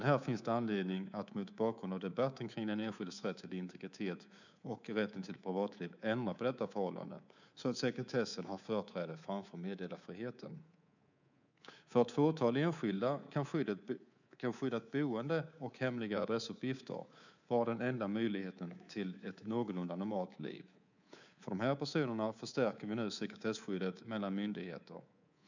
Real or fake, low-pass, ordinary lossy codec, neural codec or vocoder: fake; 7.2 kHz; none; codec, 24 kHz, 3.1 kbps, DualCodec